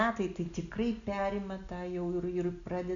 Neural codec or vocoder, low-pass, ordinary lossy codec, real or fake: none; 7.2 kHz; AAC, 64 kbps; real